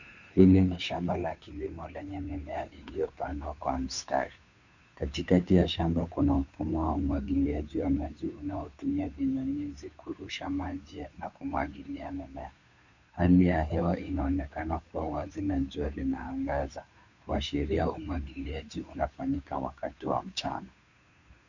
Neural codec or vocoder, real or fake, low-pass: codec, 16 kHz, 2 kbps, FunCodec, trained on Chinese and English, 25 frames a second; fake; 7.2 kHz